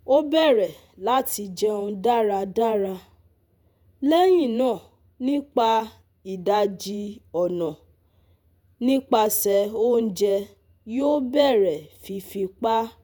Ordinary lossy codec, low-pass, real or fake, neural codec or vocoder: none; none; fake; vocoder, 48 kHz, 128 mel bands, Vocos